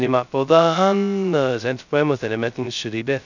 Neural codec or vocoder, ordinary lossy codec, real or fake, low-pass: codec, 16 kHz, 0.2 kbps, FocalCodec; none; fake; 7.2 kHz